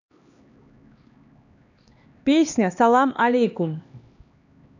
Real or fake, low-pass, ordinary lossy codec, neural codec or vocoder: fake; 7.2 kHz; none; codec, 16 kHz, 2 kbps, X-Codec, HuBERT features, trained on LibriSpeech